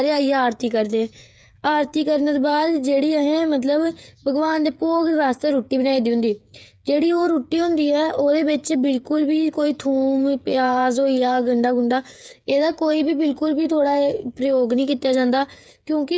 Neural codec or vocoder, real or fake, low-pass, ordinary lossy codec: codec, 16 kHz, 8 kbps, FreqCodec, smaller model; fake; none; none